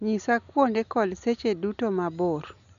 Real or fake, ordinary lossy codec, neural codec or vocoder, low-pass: real; MP3, 96 kbps; none; 7.2 kHz